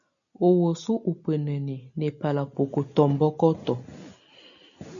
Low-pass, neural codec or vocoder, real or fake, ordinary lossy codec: 7.2 kHz; none; real; MP3, 96 kbps